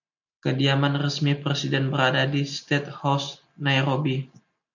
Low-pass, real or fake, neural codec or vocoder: 7.2 kHz; real; none